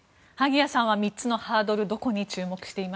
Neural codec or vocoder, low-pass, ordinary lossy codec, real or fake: none; none; none; real